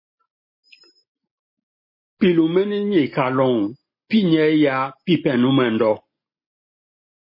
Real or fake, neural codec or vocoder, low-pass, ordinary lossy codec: real; none; 5.4 kHz; MP3, 24 kbps